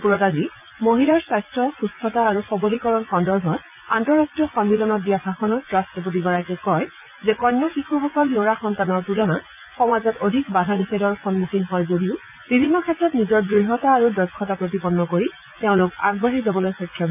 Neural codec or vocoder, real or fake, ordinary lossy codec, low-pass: vocoder, 44.1 kHz, 80 mel bands, Vocos; fake; none; 3.6 kHz